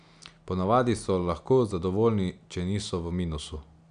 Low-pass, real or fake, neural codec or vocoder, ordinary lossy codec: 9.9 kHz; real; none; none